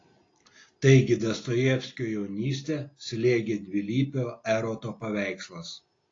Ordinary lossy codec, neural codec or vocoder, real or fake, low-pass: AAC, 32 kbps; none; real; 7.2 kHz